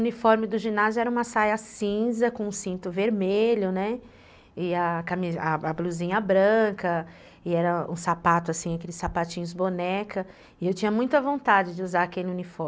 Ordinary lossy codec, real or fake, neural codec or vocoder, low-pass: none; real; none; none